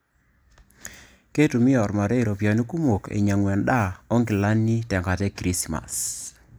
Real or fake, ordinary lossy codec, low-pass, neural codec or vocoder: real; none; none; none